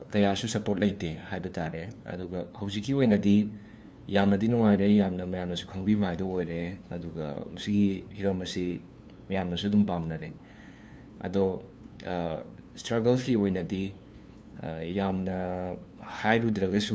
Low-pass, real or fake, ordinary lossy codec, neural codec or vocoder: none; fake; none; codec, 16 kHz, 2 kbps, FunCodec, trained on LibriTTS, 25 frames a second